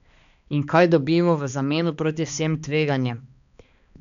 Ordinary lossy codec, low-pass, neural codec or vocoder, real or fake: none; 7.2 kHz; codec, 16 kHz, 2 kbps, X-Codec, HuBERT features, trained on balanced general audio; fake